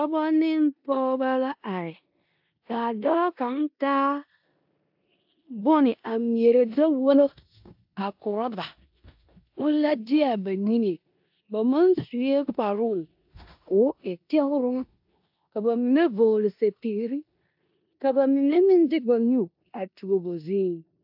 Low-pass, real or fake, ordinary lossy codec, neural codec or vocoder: 5.4 kHz; fake; AAC, 48 kbps; codec, 16 kHz in and 24 kHz out, 0.9 kbps, LongCat-Audio-Codec, four codebook decoder